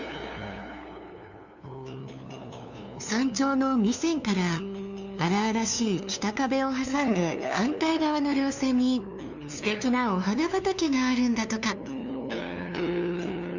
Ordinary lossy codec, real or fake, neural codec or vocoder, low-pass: none; fake; codec, 16 kHz, 2 kbps, FunCodec, trained on LibriTTS, 25 frames a second; 7.2 kHz